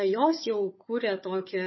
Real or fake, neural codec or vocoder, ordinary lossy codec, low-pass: fake; codec, 16 kHz, 4 kbps, FunCodec, trained on Chinese and English, 50 frames a second; MP3, 24 kbps; 7.2 kHz